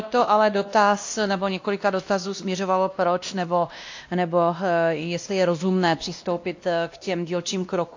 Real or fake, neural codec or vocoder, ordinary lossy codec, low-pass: fake; codec, 16 kHz, 1 kbps, X-Codec, WavLM features, trained on Multilingual LibriSpeech; AAC, 48 kbps; 7.2 kHz